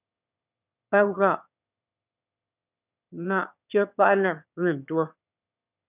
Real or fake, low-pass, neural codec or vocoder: fake; 3.6 kHz; autoencoder, 22.05 kHz, a latent of 192 numbers a frame, VITS, trained on one speaker